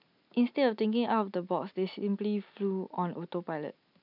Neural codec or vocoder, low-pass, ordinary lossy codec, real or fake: none; 5.4 kHz; none; real